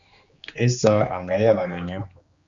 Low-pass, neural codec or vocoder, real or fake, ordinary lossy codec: 7.2 kHz; codec, 16 kHz, 2 kbps, X-Codec, HuBERT features, trained on general audio; fake; Opus, 64 kbps